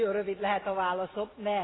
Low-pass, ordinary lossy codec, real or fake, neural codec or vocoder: 7.2 kHz; AAC, 16 kbps; real; none